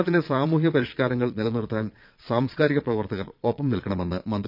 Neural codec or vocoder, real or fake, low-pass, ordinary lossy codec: vocoder, 22.05 kHz, 80 mel bands, Vocos; fake; 5.4 kHz; none